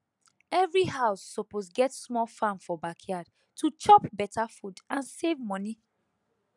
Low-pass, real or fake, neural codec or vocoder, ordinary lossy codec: 10.8 kHz; real; none; none